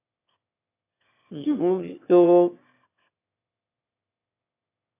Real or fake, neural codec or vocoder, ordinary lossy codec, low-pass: fake; autoencoder, 22.05 kHz, a latent of 192 numbers a frame, VITS, trained on one speaker; AAC, 24 kbps; 3.6 kHz